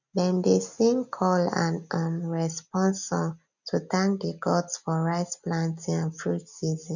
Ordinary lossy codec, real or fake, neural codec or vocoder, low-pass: none; real; none; 7.2 kHz